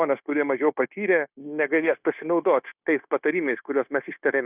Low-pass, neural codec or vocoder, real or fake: 3.6 kHz; codec, 16 kHz, 0.9 kbps, LongCat-Audio-Codec; fake